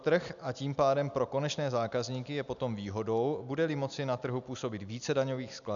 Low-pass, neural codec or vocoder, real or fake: 7.2 kHz; none; real